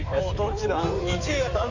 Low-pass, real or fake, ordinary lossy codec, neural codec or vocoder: 7.2 kHz; fake; none; codec, 16 kHz in and 24 kHz out, 2.2 kbps, FireRedTTS-2 codec